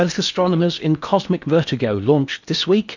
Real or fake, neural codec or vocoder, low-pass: fake; codec, 16 kHz in and 24 kHz out, 0.8 kbps, FocalCodec, streaming, 65536 codes; 7.2 kHz